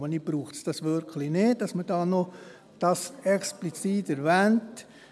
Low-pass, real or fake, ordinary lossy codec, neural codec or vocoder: none; real; none; none